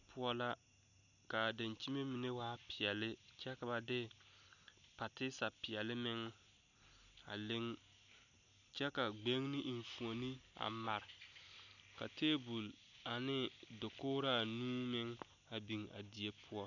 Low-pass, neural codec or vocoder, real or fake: 7.2 kHz; none; real